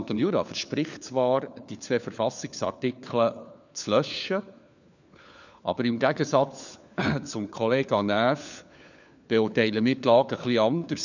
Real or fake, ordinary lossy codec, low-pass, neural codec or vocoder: fake; none; 7.2 kHz; codec, 16 kHz, 4 kbps, FunCodec, trained on LibriTTS, 50 frames a second